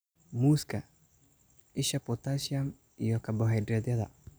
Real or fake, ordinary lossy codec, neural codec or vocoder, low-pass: real; none; none; none